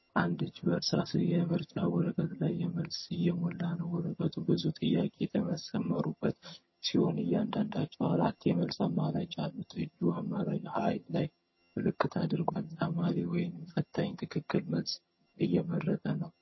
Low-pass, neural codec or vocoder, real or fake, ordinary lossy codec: 7.2 kHz; vocoder, 22.05 kHz, 80 mel bands, HiFi-GAN; fake; MP3, 24 kbps